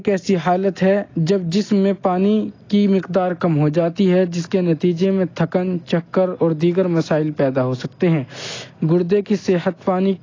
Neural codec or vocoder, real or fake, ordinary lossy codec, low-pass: none; real; AAC, 32 kbps; 7.2 kHz